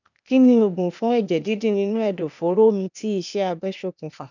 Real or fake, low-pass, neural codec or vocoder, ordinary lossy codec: fake; 7.2 kHz; codec, 16 kHz, 0.8 kbps, ZipCodec; none